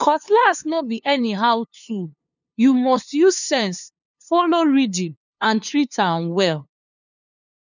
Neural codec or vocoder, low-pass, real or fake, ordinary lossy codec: codec, 16 kHz, 2 kbps, FunCodec, trained on LibriTTS, 25 frames a second; 7.2 kHz; fake; none